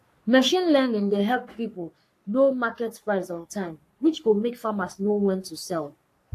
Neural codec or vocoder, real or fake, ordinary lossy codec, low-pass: codec, 44.1 kHz, 3.4 kbps, Pupu-Codec; fake; AAC, 64 kbps; 14.4 kHz